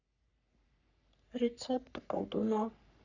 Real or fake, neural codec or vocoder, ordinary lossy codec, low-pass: fake; codec, 44.1 kHz, 3.4 kbps, Pupu-Codec; none; 7.2 kHz